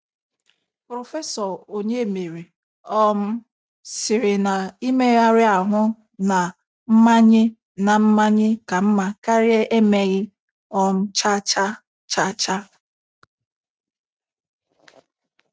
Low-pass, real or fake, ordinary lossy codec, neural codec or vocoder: none; real; none; none